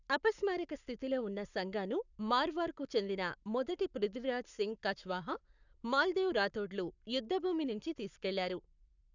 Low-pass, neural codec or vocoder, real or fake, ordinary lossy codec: 7.2 kHz; codec, 44.1 kHz, 7.8 kbps, Pupu-Codec; fake; none